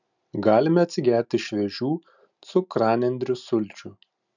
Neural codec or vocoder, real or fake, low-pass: none; real; 7.2 kHz